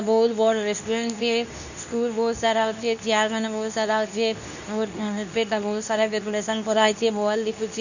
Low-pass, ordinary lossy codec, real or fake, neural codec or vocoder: 7.2 kHz; none; fake; codec, 24 kHz, 0.9 kbps, WavTokenizer, medium speech release version 2